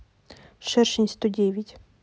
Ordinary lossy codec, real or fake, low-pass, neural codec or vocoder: none; real; none; none